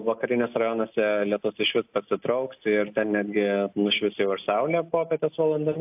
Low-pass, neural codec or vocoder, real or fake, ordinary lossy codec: 3.6 kHz; none; real; AAC, 32 kbps